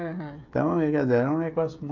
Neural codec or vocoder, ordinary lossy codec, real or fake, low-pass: codec, 16 kHz, 16 kbps, FreqCodec, smaller model; none; fake; 7.2 kHz